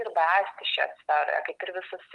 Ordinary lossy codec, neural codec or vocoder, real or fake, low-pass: Opus, 32 kbps; none; real; 10.8 kHz